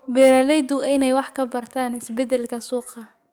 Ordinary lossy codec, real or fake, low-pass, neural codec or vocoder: none; fake; none; codec, 44.1 kHz, 7.8 kbps, DAC